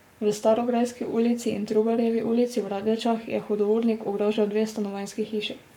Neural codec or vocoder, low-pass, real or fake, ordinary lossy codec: codec, 44.1 kHz, 7.8 kbps, Pupu-Codec; 19.8 kHz; fake; none